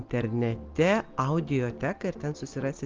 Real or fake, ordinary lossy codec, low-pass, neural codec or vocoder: real; Opus, 32 kbps; 7.2 kHz; none